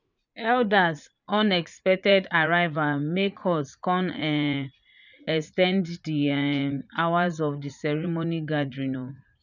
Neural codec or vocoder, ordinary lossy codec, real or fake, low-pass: vocoder, 44.1 kHz, 80 mel bands, Vocos; none; fake; 7.2 kHz